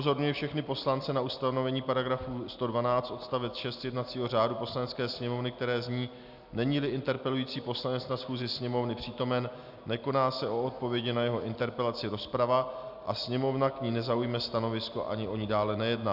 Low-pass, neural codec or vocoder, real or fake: 5.4 kHz; none; real